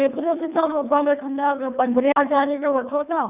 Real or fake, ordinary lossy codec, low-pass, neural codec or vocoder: fake; none; 3.6 kHz; codec, 24 kHz, 1.5 kbps, HILCodec